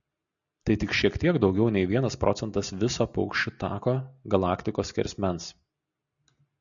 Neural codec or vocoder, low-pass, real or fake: none; 7.2 kHz; real